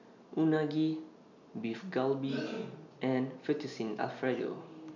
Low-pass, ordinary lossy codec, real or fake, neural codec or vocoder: 7.2 kHz; none; real; none